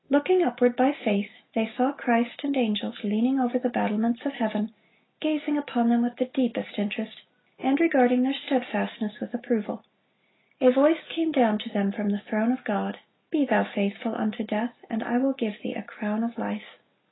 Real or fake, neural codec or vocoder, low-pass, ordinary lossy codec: real; none; 7.2 kHz; AAC, 16 kbps